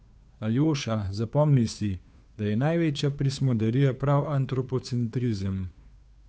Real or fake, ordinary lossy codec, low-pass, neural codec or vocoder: fake; none; none; codec, 16 kHz, 2 kbps, FunCodec, trained on Chinese and English, 25 frames a second